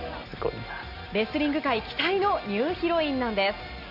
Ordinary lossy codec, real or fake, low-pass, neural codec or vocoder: none; real; 5.4 kHz; none